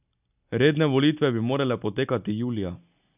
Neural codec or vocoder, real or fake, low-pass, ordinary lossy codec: none; real; 3.6 kHz; none